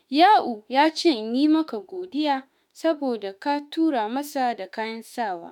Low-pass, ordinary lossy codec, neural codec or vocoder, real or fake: 19.8 kHz; none; autoencoder, 48 kHz, 32 numbers a frame, DAC-VAE, trained on Japanese speech; fake